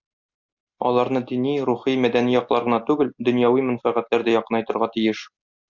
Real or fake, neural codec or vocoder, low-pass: real; none; 7.2 kHz